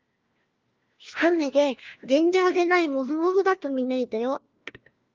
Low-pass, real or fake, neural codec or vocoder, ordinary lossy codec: 7.2 kHz; fake; codec, 16 kHz, 1 kbps, FunCodec, trained on LibriTTS, 50 frames a second; Opus, 24 kbps